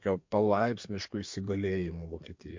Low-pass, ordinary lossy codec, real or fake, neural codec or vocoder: 7.2 kHz; MP3, 48 kbps; fake; codec, 32 kHz, 1.9 kbps, SNAC